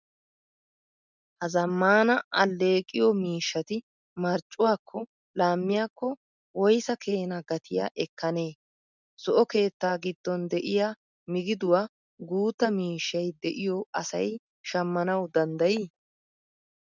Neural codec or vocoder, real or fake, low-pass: none; real; 7.2 kHz